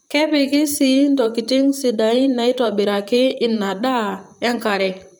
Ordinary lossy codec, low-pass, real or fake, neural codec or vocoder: none; none; fake; vocoder, 44.1 kHz, 128 mel bands, Pupu-Vocoder